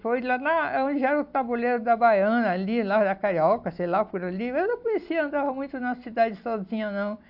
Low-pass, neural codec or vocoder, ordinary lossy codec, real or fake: 5.4 kHz; none; AAC, 48 kbps; real